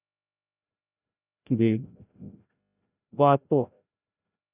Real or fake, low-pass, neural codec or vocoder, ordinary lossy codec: fake; 3.6 kHz; codec, 16 kHz, 0.5 kbps, FreqCodec, larger model; none